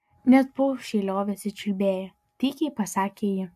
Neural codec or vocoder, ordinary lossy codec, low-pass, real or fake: none; AAC, 96 kbps; 14.4 kHz; real